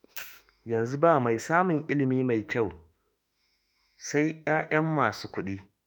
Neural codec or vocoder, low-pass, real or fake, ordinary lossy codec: autoencoder, 48 kHz, 32 numbers a frame, DAC-VAE, trained on Japanese speech; none; fake; none